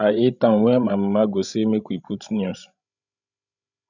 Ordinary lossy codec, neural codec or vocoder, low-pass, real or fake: none; codec, 16 kHz, 16 kbps, FreqCodec, larger model; 7.2 kHz; fake